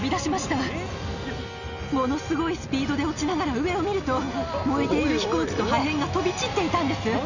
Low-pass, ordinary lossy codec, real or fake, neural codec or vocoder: 7.2 kHz; none; real; none